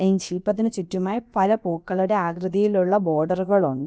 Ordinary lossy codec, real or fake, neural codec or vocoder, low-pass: none; fake; codec, 16 kHz, about 1 kbps, DyCAST, with the encoder's durations; none